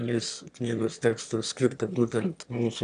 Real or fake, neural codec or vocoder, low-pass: fake; autoencoder, 22.05 kHz, a latent of 192 numbers a frame, VITS, trained on one speaker; 9.9 kHz